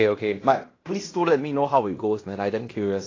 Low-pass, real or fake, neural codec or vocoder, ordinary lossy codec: 7.2 kHz; fake; codec, 16 kHz in and 24 kHz out, 0.9 kbps, LongCat-Audio-Codec, fine tuned four codebook decoder; AAC, 32 kbps